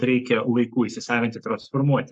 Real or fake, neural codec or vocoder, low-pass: fake; codec, 44.1 kHz, 7.8 kbps, Pupu-Codec; 9.9 kHz